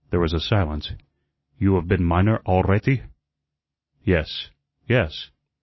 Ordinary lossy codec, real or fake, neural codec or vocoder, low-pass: MP3, 24 kbps; real; none; 7.2 kHz